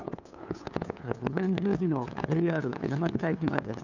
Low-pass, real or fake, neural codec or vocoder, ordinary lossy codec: 7.2 kHz; fake; codec, 16 kHz, 2 kbps, FunCodec, trained on LibriTTS, 25 frames a second; none